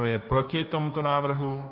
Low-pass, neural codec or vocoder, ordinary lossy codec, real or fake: 5.4 kHz; codec, 16 kHz, 1.1 kbps, Voila-Tokenizer; AAC, 48 kbps; fake